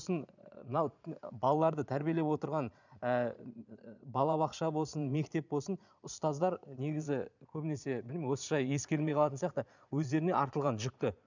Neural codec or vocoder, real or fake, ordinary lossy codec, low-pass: vocoder, 22.05 kHz, 80 mel bands, Vocos; fake; none; 7.2 kHz